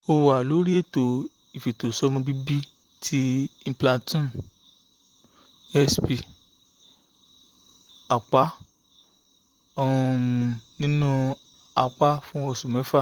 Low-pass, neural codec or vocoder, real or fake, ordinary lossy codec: 19.8 kHz; vocoder, 44.1 kHz, 128 mel bands, Pupu-Vocoder; fake; Opus, 24 kbps